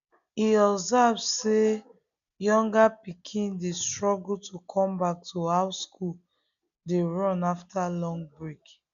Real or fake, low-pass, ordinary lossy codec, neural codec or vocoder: real; 7.2 kHz; none; none